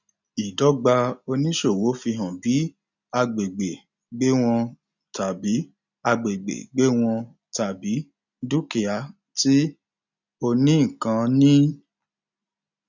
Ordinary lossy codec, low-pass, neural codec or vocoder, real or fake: none; 7.2 kHz; none; real